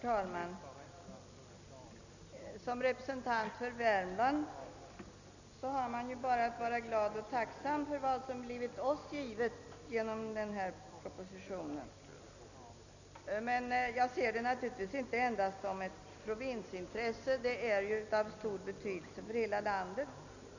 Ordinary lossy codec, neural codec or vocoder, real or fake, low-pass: none; none; real; 7.2 kHz